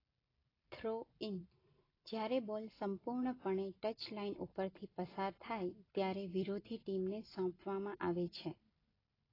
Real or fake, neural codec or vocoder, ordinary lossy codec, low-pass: real; none; AAC, 24 kbps; 5.4 kHz